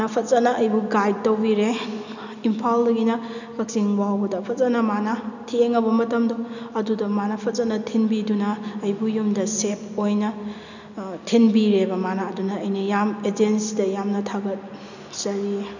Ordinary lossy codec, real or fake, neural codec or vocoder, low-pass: none; real; none; 7.2 kHz